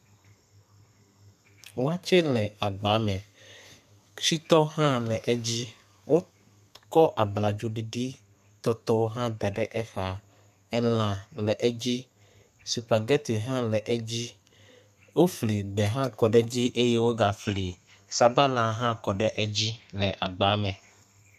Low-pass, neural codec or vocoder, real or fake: 14.4 kHz; codec, 32 kHz, 1.9 kbps, SNAC; fake